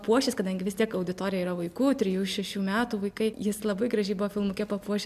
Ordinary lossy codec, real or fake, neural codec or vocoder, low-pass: MP3, 96 kbps; real; none; 14.4 kHz